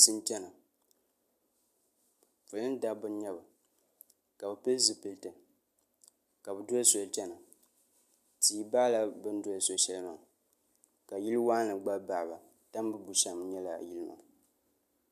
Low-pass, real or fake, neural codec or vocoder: 14.4 kHz; real; none